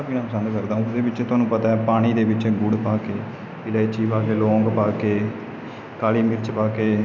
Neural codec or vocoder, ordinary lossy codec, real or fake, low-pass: none; none; real; none